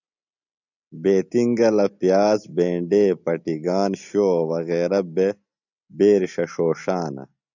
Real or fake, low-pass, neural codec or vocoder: real; 7.2 kHz; none